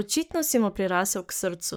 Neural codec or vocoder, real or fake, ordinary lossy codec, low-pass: codec, 44.1 kHz, 7.8 kbps, Pupu-Codec; fake; none; none